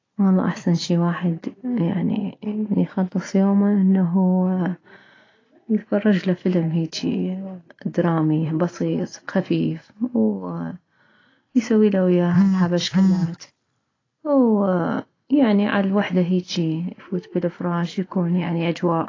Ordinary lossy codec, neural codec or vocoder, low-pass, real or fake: AAC, 32 kbps; none; 7.2 kHz; real